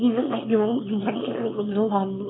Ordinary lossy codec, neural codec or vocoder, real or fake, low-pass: AAC, 16 kbps; autoencoder, 22.05 kHz, a latent of 192 numbers a frame, VITS, trained on one speaker; fake; 7.2 kHz